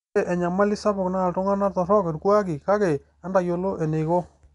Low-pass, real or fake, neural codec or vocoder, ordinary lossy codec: 10.8 kHz; real; none; MP3, 96 kbps